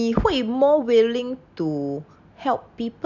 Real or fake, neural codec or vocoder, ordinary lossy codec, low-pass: real; none; none; 7.2 kHz